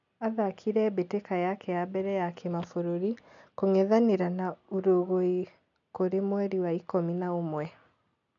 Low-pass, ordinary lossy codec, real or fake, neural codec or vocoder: 7.2 kHz; none; real; none